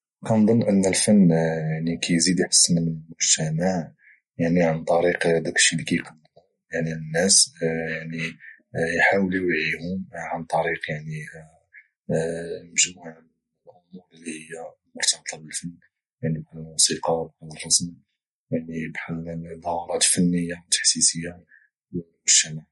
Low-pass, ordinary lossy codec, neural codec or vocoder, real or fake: 19.8 kHz; MP3, 48 kbps; none; real